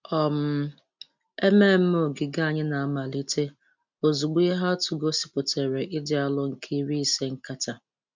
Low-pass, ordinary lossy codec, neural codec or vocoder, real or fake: 7.2 kHz; MP3, 64 kbps; none; real